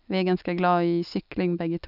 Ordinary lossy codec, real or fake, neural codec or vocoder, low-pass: none; real; none; 5.4 kHz